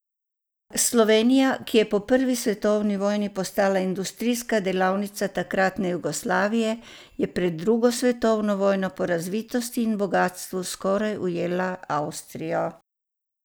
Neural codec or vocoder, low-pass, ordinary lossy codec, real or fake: none; none; none; real